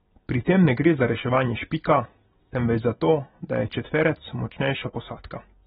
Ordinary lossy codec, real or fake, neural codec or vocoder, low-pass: AAC, 16 kbps; real; none; 19.8 kHz